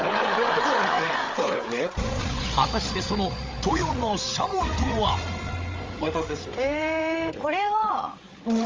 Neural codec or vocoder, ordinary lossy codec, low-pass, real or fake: codec, 16 kHz, 8 kbps, FreqCodec, larger model; Opus, 32 kbps; 7.2 kHz; fake